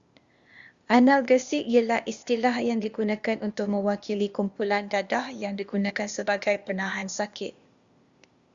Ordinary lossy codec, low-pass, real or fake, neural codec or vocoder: Opus, 64 kbps; 7.2 kHz; fake; codec, 16 kHz, 0.8 kbps, ZipCodec